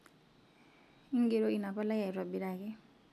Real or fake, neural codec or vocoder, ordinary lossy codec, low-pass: real; none; none; 14.4 kHz